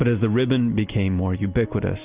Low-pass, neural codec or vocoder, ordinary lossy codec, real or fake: 3.6 kHz; none; Opus, 32 kbps; real